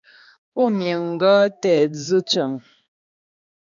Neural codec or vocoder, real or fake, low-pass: codec, 16 kHz, 2 kbps, X-Codec, HuBERT features, trained on balanced general audio; fake; 7.2 kHz